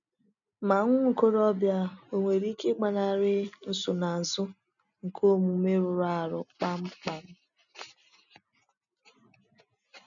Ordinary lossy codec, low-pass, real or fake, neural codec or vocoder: none; 7.2 kHz; real; none